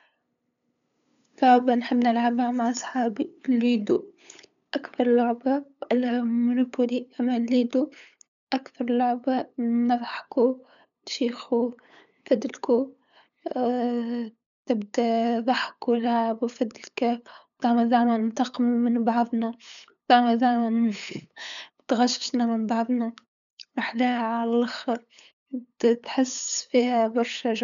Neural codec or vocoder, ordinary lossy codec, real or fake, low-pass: codec, 16 kHz, 8 kbps, FunCodec, trained on LibriTTS, 25 frames a second; none; fake; 7.2 kHz